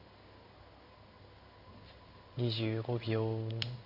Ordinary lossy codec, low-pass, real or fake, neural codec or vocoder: Opus, 64 kbps; 5.4 kHz; fake; codec, 16 kHz in and 24 kHz out, 1 kbps, XY-Tokenizer